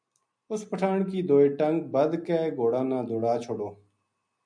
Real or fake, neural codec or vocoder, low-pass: real; none; 9.9 kHz